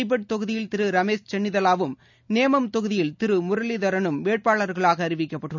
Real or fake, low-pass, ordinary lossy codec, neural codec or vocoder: real; 7.2 kHz; none; none